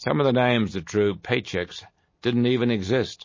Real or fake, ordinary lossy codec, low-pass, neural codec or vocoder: real; MP3, 32 kbps; 7.2 kHz; none